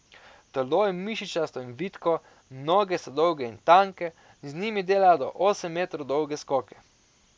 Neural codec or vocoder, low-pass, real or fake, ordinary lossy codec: none; none; real; none